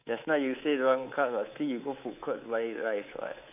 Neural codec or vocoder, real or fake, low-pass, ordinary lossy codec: codec, 24 kHz, 3.1 kbps, DualCodec; fake; 3.6 kHz; AAC, 32 kbps